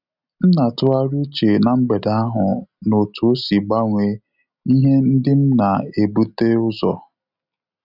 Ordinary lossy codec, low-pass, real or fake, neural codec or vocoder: none; 5.4 kHz; real; none